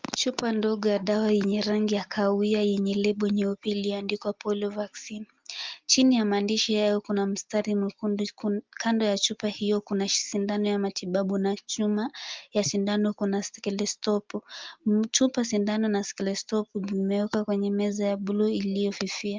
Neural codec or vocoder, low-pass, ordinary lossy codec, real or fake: none; 7.2 kHz; Opus, 32 kbps; real